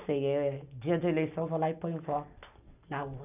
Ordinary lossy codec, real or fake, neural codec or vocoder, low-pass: none; real; none; 3.6 kHz